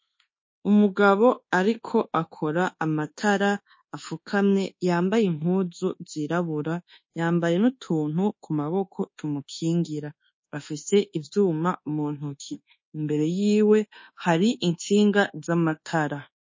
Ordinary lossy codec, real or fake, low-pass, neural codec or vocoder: MP3, 32 kbps; fake; 7.2 kHz; codec, 24 kHz, 1.2 kbps, DualCodec